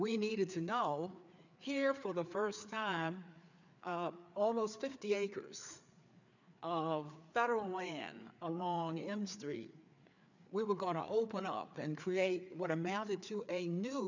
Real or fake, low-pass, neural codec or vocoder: fake; 7.2 kHz; codec, 16 kHz, 4 kbps, FreqCodec, larger model